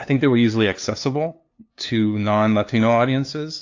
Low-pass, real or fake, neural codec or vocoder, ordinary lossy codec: 7.2 kHz; fake; codec, 16 kHz, 2 kbps, FunCodec, trained on LibriTTS, 25 frames a second; AAC, 48 kbps